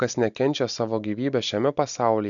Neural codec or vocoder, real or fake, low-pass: none; real; 7.2 kHz